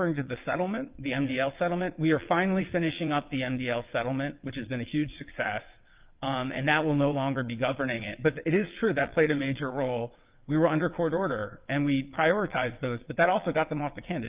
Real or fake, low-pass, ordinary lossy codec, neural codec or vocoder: fake; 3.6 kHz; Opus, 32 kbps; vocoder, 22.05 kHz, 80 mel bands, WaveNeXt